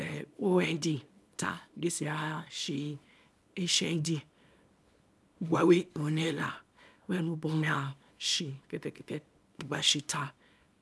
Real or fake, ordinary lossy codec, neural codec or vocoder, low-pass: fake; none; codec, 24 kHz, 0.9 kbps, WavTokenizer, small release; none